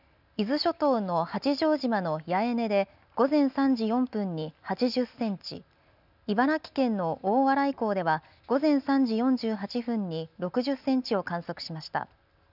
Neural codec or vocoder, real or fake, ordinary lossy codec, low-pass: none; real; none; 5.4 kHz